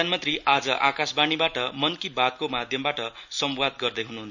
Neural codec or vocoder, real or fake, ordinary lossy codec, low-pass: none; real; none; 7.2 kHz